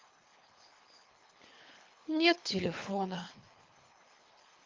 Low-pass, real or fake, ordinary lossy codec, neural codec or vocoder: 7.2 kHz; fake; Opus, 32 kbps; codec, 24 kHz, 3 kbps, HILCodec